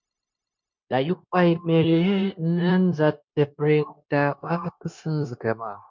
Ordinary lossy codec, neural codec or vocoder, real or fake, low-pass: MP3, 48 kbps; codec, 16 kHz, 0.9 kbps, LongCat-Audio-Codec; fake; 7.2 kHz